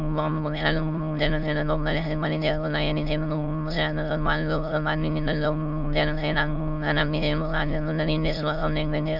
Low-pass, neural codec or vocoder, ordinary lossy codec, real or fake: 5.4 kHz; autoencoder, 22.05 kHz, a latent of 192 numbers a frame, VITS, trained on many speakers; none; fake